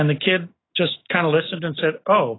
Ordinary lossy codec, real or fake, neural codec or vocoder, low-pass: AAC, 16 kbps; fake; autoencoder, 48 kHz, 128 numbers a frame, DAC-VAE, trained on Japanese speech; 7.2 kHz